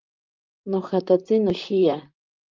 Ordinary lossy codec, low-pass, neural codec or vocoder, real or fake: Opus, 24 kbps; 7.2 kHz; vocoder, 44.1 kHz, 128 mel bands, Pupu-Vocoder; fake